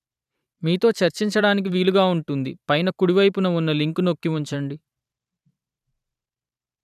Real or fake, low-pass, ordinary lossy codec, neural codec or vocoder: fake; 14.4 kHz; none; vocoder, 44.1 kHz, 128 mel bands every 512 samples, BigVGAN v2